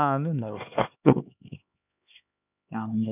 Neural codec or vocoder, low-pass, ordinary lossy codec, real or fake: codec, 16 kHz, 2 kbps, X-Codec, HuBERT features, trained on LibriSpeech; 3.6 kHz; none; fake